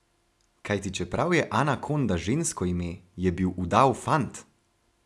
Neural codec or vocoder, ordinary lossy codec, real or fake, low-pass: none; none; real; none